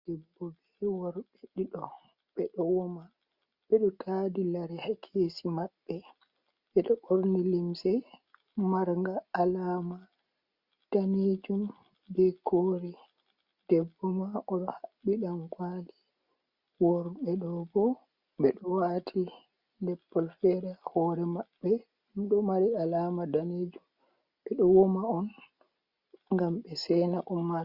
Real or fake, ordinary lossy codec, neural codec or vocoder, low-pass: real; Opus, 64 kbps; none; 5.4 kHz